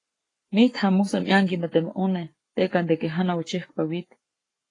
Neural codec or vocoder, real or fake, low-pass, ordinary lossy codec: codec, 44.1 kHz, 7.8 kbps, Pupu-Codec; fake; 10.8 kHz; AAC, 32 kbps